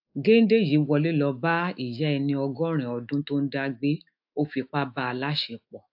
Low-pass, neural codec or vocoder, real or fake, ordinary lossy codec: 5.4 kHz; autoencoder, 48 kHz, 128 numbers a frame, DAC-VAE, trained on Japanese speech; fake; AAC, 48 kbps